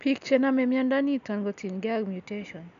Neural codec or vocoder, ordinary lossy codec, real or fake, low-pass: none; none; real; 7.2 kHz